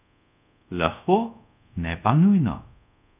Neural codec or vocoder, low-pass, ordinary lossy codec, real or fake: codec, 24 kHz, 0.5 kbps, DualCodec; 3.6 kHz; none; fake